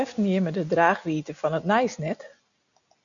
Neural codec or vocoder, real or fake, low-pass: none; real; 7.2 kHz